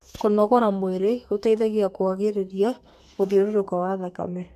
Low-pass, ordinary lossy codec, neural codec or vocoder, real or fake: 14.4 kHz; none; codec, 32 kHz, 1.9 kbps, SNAC; fake